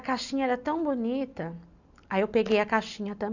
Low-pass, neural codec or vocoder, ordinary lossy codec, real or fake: 7.2 kHz; none; none; real